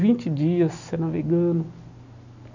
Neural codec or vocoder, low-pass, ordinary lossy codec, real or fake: none; 7.2 kHz; none; real